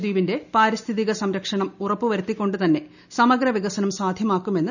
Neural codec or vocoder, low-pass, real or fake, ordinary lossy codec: none; 7.2 kHz; real; none